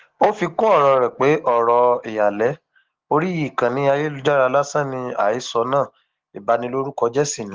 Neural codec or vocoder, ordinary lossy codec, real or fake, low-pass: none; Opus, 16 kbps; real; 7.2 kHz